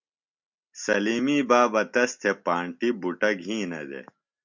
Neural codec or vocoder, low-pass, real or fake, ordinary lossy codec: none; 7.2 kHz; real; MP3, 64 kbps